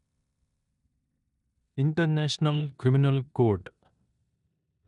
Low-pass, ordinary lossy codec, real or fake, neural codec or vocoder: 10.8 kHz; none; fake; codec, 16 kHz in and 24 kHz out, 0.9 kbps, LongCat-Audio-Codec, four codebook decoder